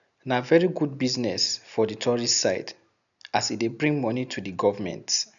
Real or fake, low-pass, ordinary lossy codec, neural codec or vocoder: real; 7.2 kHz; none; none